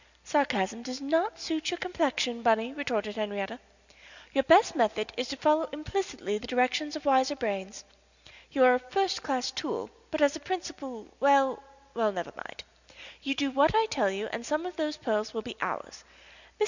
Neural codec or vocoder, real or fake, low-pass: none; real; 7.2 kHz